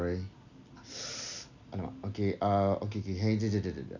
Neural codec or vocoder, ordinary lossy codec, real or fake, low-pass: none; none; real; 7.2 kHz